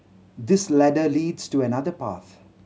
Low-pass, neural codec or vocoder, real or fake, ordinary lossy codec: none; none; real; none